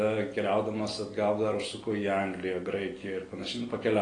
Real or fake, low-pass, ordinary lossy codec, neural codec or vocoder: real; 9.9 kHz; AAC, 32 kbps; none